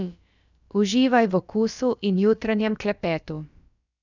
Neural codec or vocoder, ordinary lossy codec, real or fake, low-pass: codec, 16 kHz, about 1 kbps, DyCAST, with the encoder's durations; none; fake; 7.2 kHz